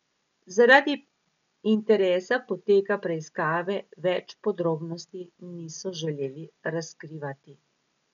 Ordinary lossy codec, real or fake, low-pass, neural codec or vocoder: none; real; 7.2 kHz; none